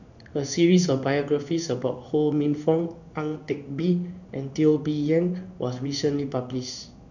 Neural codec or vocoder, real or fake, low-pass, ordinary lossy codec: codec, 16 kHz in and 24 kHz out, 1 kbps, XY-Tokenizer; fake; 7.2 kHz; none